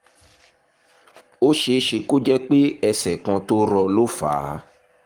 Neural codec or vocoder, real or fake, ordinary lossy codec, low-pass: codec, 44.1 kHz, 7.8 kbps, Pupu-Codec; fake; Opus, 24 kbps; 19.8 kHz